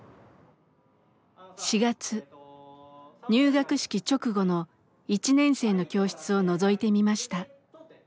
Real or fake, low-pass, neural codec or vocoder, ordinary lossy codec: real; none; none; none